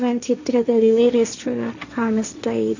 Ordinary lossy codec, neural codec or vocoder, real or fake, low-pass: none; codec, 16 kHz, 1.1 kbps, Voila-Tokenizer; fake; 7.2 kHz